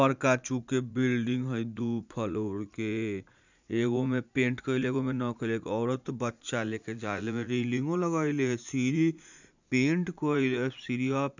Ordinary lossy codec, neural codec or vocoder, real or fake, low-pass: none; vocoder, 44.1 kHz, 80 mel bands, Vocos; fake; 7.2 kHz